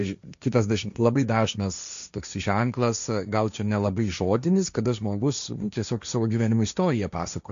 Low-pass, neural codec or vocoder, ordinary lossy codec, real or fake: 7.2 kHz; codec, 16 kHz, 1.1 kbps, Voila-Tokenizer; MP3, 64 kbps; fake